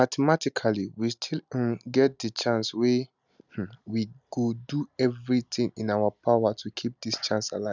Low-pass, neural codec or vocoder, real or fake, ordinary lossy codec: 7.2 kHz; none; real; none